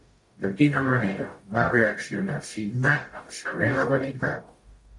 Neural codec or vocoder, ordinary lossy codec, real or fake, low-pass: codec, 44.1 kHz, 0.9 kbps, DAC; AAC, 48 kbps; fake; 10.8 kHz